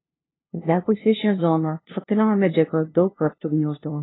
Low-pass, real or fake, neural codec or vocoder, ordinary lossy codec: 7.2 kHz; fake; codec, 16 kHz, 0.5 kbps, FunCodec, trained on LibriTTS, 25 frames a second; AAC, 16 kbps